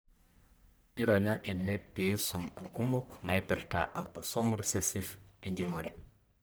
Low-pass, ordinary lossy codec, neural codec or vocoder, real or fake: none; none; codec, 44.1 kHz, 1.7 kbps, Pupu-Codec; fake